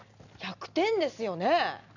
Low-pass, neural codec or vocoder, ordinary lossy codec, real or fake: 7.2 kHz; none; none; real